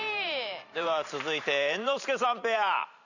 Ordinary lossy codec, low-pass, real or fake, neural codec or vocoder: none; 7.2 kHz; fake; vocoder, 44.1 kHz, 128 mel bands every 512 samples, BigVGAN v2